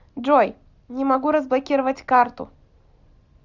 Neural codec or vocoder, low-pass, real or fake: none; 7.2 kHz; real